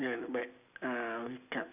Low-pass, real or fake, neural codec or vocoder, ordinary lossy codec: 3.6 kHz; real; none; none